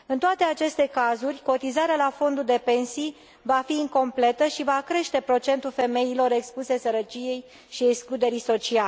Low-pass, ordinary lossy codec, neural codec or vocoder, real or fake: none; none; none; real